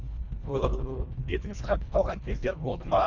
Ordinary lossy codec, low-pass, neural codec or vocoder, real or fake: none; 7.2 kHz; codec, 24 kHz, 1.5 kbps, HILCodec; fake